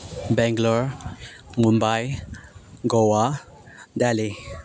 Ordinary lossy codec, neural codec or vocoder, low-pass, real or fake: none; none; none; real